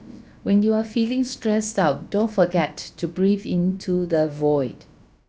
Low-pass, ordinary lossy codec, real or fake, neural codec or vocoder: none; none; fake; codec, 16 kHz, about 1 kbps, DyCAST, with the encoder's durations